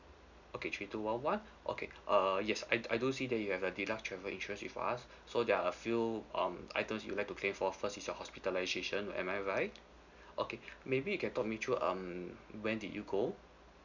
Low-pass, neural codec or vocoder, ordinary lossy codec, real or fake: 7.2 kHz; none; none; real